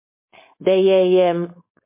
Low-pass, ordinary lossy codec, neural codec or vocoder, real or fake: 3.6 kHz; MP3, 24 kbps; codec, 16 kHz, 4.8 kbps, FACodec; fake